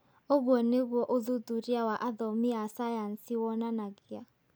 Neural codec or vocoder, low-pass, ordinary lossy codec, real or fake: none; none; none; real